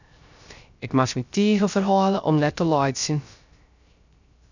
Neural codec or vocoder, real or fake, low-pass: codec, 16 kHz, 0.3 kbps, FocalCodec; fake; 7.2 kHz